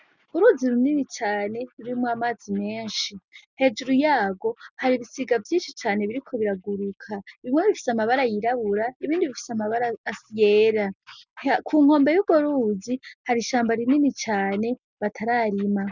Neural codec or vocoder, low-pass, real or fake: none; 7.2 kHz; real